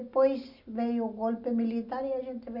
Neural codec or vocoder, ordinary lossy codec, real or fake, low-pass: none; none; real; 5.4 kHz